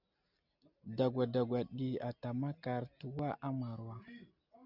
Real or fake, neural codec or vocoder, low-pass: real; none; 5.4 kHz